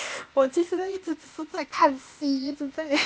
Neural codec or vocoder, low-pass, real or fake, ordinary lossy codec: codec, 16 kHz, 0.8 kbps, ZipCodec; none; fake; none